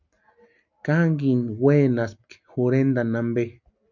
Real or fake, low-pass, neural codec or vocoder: real; 7.2 kHz; none